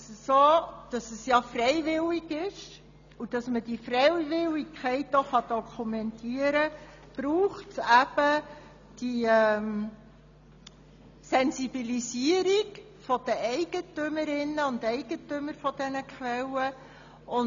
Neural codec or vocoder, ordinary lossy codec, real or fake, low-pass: none; none; real; 7.2 kHz